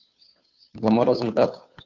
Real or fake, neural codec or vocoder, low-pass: fake; codec, 16 kHz, 2 kbps, FunCodec, trained on Chinese and English, 25 frames a second; 7.2 kHz